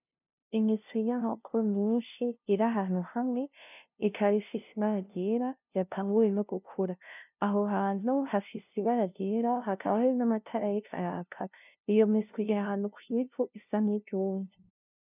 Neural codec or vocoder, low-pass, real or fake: codec, 16 kHz, 0.5 kbps, FunCodec, trained on LibriTTS, 25 frames a second; 3.6 kHz; fake